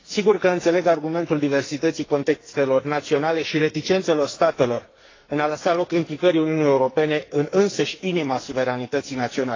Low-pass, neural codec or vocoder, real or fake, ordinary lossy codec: 7.2 kHz; codec, 44.1 kHz, 2.6 kbps, SNAC; fake; AAC, 32 kbps